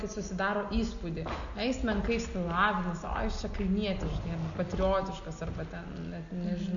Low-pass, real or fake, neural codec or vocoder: 7.2 kHz; real; none